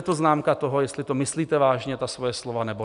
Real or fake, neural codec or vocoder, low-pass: real; none; 10.8 kHz